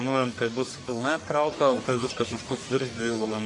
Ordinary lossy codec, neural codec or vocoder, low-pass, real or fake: AAC, 48 kbps; codec, 44.1 kHz, 1.7 kbps, Pupu-Codec; 10.8 kHz; fake